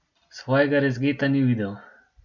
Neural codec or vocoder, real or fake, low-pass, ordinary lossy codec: none; real; 7.2 kHz; none